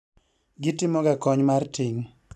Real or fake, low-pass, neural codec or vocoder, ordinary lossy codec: real; none; none; none